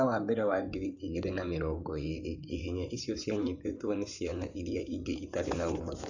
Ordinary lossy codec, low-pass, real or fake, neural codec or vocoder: none; 7.2 kHz; fake; codec, 16 kHz in and 24 kHz out, 2.2 kbps, FireRedTTS-2 codec